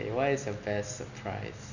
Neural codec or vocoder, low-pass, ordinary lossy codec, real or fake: none; 7.2 kHz; none; real